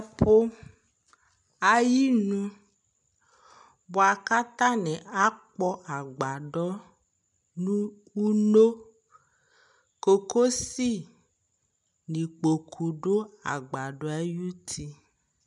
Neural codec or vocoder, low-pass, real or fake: none; 10.8 kHz; real